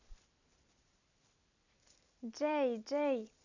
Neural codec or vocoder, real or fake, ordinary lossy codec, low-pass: vocoder, 44.1 kHz, 128 mel bands every 256 samples, BigVGAN v2; fake; none; 7.2 kHz